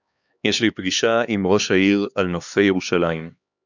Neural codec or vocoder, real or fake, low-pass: codec, 16 kHz, 2 kbps, X-Codec, HuBERT features, trained on LibriSpeech; fake; 7.2 kHz